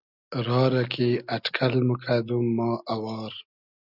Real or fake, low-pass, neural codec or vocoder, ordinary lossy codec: real; 5.4 kHz; none; Opus, 64 kbps